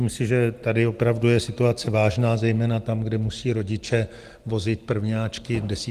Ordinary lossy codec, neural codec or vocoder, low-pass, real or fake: Opus, 24 kbps; none; 14.4 kHz; real